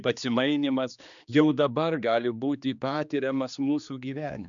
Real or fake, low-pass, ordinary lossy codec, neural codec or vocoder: fake; 7.2 kHz; MP3, 96 kbps; codec, 16 kHz, 2 kbps, X-Codec, HuBERT features, trained on general audio